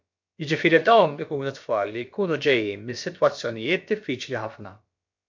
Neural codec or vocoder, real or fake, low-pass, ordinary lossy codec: codec, 16 kHz, about 1 kbps, DyCAST, with the encoder's durations; fake; 7.2 kHz; MP3, 48 kbps